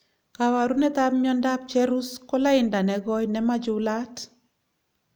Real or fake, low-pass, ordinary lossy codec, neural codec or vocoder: real; none; none; none